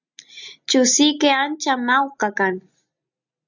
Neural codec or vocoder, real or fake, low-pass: none; real; 7.2 kHz